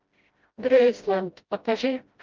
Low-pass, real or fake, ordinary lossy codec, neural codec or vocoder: 7.2 kHz; fake; Opus, 24 kbps; codec, 16 kHz, 0.5 kbps, FreqCodec, smaller model